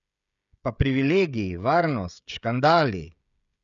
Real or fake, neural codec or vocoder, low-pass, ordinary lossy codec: fake; codec, 16 kHz, 16 kbps, FreqCodec, smaller model; 7.2 kHz; none